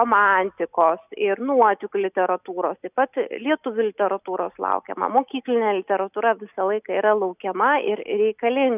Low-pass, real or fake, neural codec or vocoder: 3.6 kHz; real; none